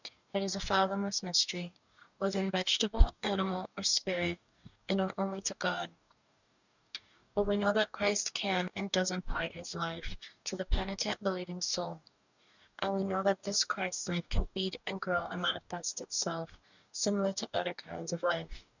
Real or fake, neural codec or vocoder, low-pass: fake; codec, 44.1 kHz, 2.6 kbps, DAC; 7.2 kHz